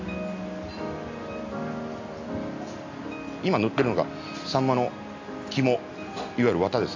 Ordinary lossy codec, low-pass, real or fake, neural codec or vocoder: AAC, 48 kbps; 7.2 kHz; real; none